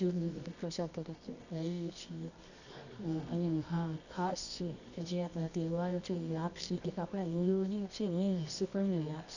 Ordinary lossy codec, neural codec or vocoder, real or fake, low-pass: none; codec, 24 kHz, 0.9 kbps, WavTokenizer, medium music audio release; fake; 7.2 kHz